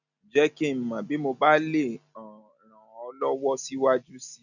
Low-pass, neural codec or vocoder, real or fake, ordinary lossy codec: 7.2 kHz; none; real; none